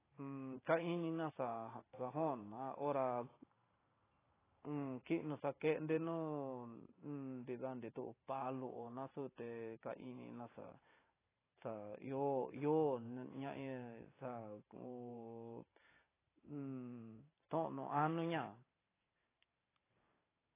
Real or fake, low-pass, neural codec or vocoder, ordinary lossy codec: fake; 3.6 kHz; vocoder, 44.1 kHz, 128 mel bands every 512 samples, BigVGAN v2; AAC, 16 kbps